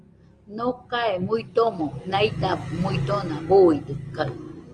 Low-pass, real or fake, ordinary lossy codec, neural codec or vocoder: 9.9 kHz; real; Opus, 24 kbps; none